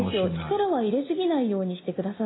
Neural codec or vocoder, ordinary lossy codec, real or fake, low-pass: none; AAC, 16 kbps; real; 7.2 kHz